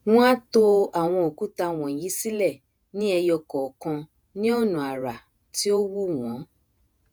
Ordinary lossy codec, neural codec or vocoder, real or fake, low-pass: none; vocoder, 48 kHz, 128 mel bands, Vocos; fake; none